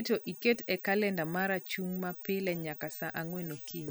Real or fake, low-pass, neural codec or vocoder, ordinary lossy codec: real; none; none; none